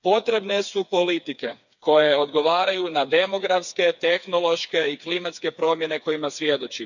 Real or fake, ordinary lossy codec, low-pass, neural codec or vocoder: fake; none; 7.2 kHz; codec, 16 kHz, 4 kbps, FreqCodec, smaller model